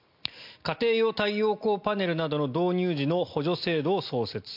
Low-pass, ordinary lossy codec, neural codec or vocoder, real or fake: 5.4 kHz; MP3, 48 kbps; none; real